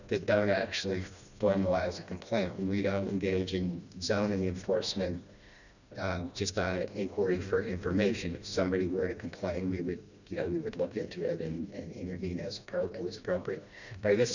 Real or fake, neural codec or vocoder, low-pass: fake; codec, 16 kHz, 1 kbps, FreqCodec, smaller model; 7.2 kHz